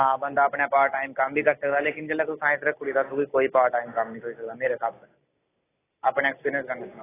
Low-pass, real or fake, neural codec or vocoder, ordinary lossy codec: 3.6 kHz; real; none; AAC, 16 kbps